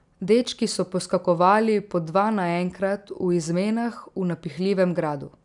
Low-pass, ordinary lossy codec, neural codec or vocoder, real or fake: 10.8 kHz; none; none; real